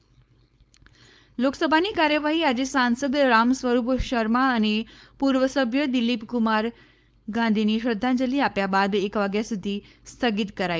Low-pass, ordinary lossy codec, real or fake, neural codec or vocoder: none; none; fake; codec, 16 kHz, 4.8 kbps, FACodec